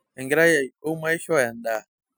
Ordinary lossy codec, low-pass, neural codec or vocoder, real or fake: none; none; none; real